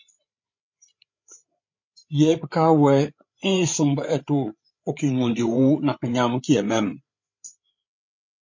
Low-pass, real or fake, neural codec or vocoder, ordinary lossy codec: 7.2 kHz; fake; codec, 16 kHz, 8 kbps, FreqCodec, larger model; MP3, 48 kbps